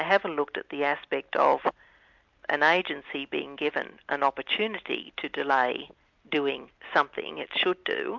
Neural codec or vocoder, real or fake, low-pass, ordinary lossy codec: none; real; 7.2 kHz; MP3, 64 kbps